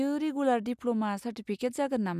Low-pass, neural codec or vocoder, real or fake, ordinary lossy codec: 14.4 kHz; none; real; none